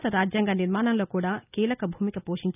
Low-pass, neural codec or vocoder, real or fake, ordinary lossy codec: 3.6 kHz; none; real; none